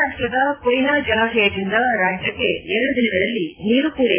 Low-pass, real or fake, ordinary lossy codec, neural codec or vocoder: 3.6 kHz; fake; AAC, 16 kbps; vocoder, 44.1 kHz, 80 mel bands, Vocos